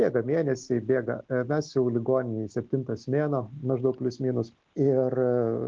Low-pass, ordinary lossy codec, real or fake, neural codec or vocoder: 7.2 kHz; Opus, 16 kbps; real; none